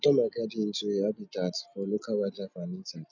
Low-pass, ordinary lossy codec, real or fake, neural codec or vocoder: 7.2 kHz; none; real; none